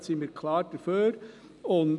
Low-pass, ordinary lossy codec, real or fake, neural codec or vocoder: 10.8 kHz; none; real; none